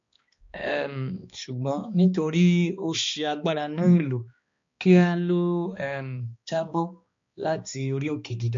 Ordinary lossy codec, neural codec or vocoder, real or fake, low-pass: MP3, 48 kbps; codec, 16 kHz, 2 kbps, X-Codec, HuBERT features, trained on balanced general audio; fake; 7.2 kHz